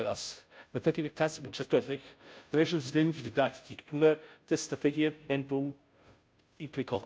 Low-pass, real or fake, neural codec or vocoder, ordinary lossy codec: none; fake; codec, 16 kHz, 0.5 kbps, FunCodec, trained on Chinese and English, 25 frames a second; none